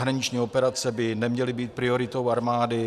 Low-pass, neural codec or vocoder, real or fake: 14.4 kHz; none; real